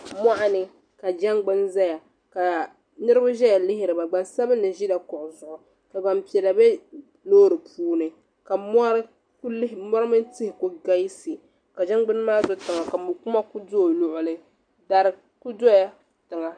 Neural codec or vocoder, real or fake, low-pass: none; real; 9.9 kHz